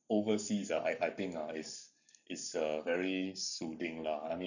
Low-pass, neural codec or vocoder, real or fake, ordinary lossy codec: 7.2 kHz; codec, 44.1 kHz, 7.8 kbps, Pupu-Codec; fake; none